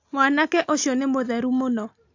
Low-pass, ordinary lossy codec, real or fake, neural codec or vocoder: 7.2 kHz; AAC, 48 kbps; real; none